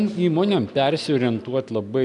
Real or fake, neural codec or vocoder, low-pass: real; none; 10.8 kHz